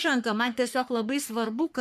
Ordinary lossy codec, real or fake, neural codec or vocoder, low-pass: MP3, 96 kbps; fake; codec, 44.1 kHz, 3.4 kbps, Pupu-Codec; 14.4 kHz